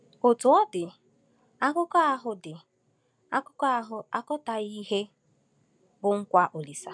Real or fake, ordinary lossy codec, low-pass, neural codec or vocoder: real; none; 9.9 kHz; none